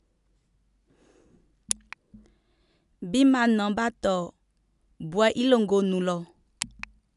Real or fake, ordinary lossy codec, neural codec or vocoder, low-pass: real; none; none; 10.8 kHz